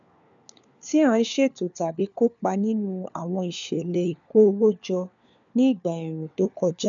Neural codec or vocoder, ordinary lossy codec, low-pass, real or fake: codec, 16 kHz, 4 kbps, FunCodec, trained on LibriTTS, 50 frames a second; none; 7.2 kHz; fake